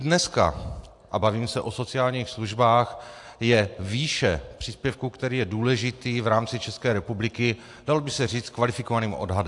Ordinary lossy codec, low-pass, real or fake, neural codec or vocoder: AAC, 64 kbps; 10.8 kHz; real; none